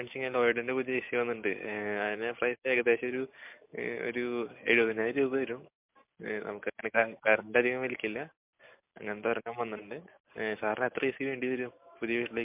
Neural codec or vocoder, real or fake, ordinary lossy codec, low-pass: none; real; MP3, 32 kbps; 3.6 kHz